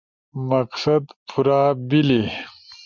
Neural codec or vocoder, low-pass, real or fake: none; 7.2 kHz; real